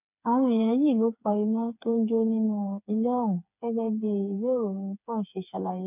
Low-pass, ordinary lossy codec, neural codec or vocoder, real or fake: 3.6 kHz; none; codec, 16 kHz, 4 kbps, FreqCodec, smaller model; fake